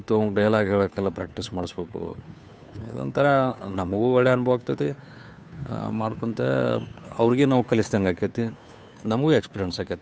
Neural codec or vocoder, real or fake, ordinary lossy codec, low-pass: codec, 16 kHz, 2 kbps, FunCodec, trained on Chinese and English, 25 frames a second; fake; none; none